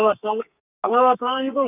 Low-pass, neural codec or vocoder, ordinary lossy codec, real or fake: 3.6 kHz; codec, 32 kHz, 1.9 kbps, SNAC; none; fake